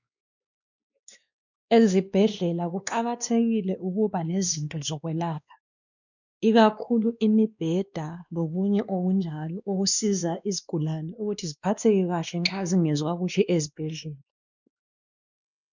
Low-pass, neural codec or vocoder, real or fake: 7.2 kHz; codec, 16 kHz, 2 kbps, X-Codec, WavLM features, trained on Multilingual LibriSpeech; fake